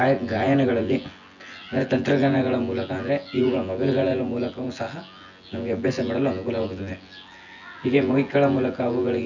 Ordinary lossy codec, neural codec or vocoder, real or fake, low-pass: none; vocoder, 24 kHz, 100 mel bands, Vocos; fake; 7.2 kHz